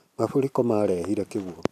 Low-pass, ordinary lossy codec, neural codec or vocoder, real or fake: 14.4 kHz; none; vocoder, 44.1 kHz, 128 mel bands every 256 samples, BigVGAN v2; fake